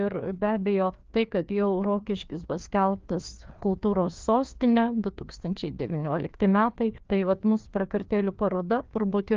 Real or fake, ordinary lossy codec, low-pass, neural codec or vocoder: fake; Opus, 24 kbps; 7.2 kHz; codec, 16 kHz, 2 kbps, FreqCodec, larger model